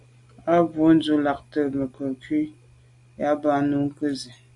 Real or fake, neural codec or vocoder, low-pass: real; none; 10.8 kHz